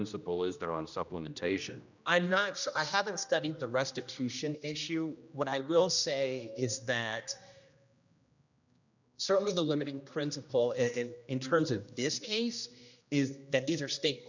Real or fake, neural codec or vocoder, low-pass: fake; codec, 16 kHz, 1 kbps, X-Codec, HuBERT features, trained on general audio; 7.2 kHz